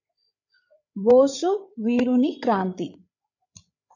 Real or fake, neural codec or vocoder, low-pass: fake; codec, 16 kHz, 8 kbps, FreqCodec, larger model; 7.2 kHz